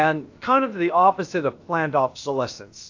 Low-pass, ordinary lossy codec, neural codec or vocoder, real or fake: 7.2 kHz; AAC, 48 kbps; codec, 16 kHz, about 1 kbps, DyCAST, with the encoder's durations; fake